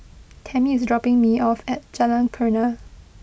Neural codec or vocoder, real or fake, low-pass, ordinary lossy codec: none; real; none; none